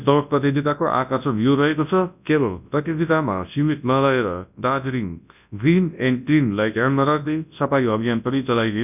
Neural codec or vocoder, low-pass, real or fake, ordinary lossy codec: codec, 24 kHz, 0.9 kbps, WavTokenizer, large speech release; 3.6 kHz; fake; none